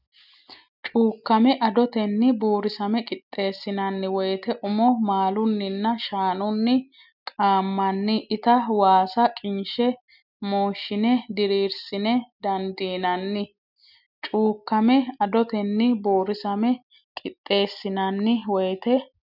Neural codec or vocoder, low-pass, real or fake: none; 5.4 kHz; real